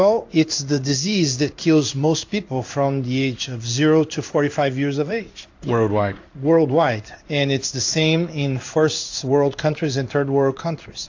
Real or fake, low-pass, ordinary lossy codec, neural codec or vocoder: fake; 7.2 kHz; AAC, 48 kbps; codec, 16 kHz in and 24 kHz out, 1 kbps, XY-Tokenizer